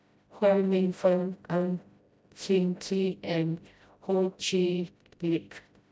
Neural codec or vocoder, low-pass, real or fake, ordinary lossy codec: codec, 16 kHz, 0.5 kbps, FreqCodec, smaller model; none; fake; none